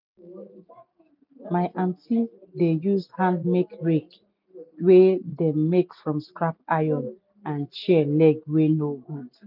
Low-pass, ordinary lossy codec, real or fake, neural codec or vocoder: 5.4 kHz; none; real; none